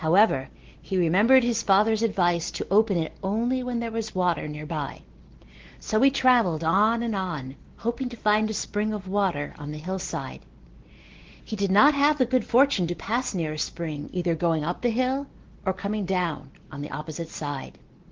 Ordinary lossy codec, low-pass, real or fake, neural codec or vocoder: Opus, 16 kbps; 7.2 kHz; real; none